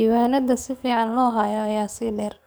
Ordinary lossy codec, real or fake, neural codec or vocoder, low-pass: none; fake; codec, 44.1 kHz, 7.8 kbps, DAC; none